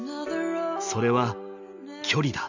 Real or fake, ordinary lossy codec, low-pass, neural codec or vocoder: real; none; 7.2 kHz; none